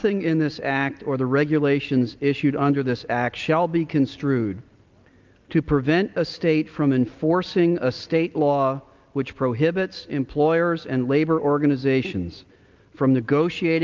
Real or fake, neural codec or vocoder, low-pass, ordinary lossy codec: real; none; 7.2 kHz; Opus, 24 kbps